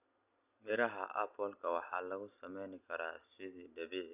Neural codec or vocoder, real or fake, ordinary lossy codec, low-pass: none; real; none; 3.6 kHz